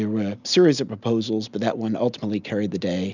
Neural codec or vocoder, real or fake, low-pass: none; real; 7.2 kHz